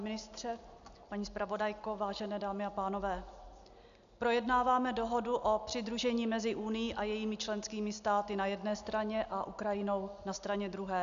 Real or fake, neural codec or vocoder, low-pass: real; none; 7.2 kHz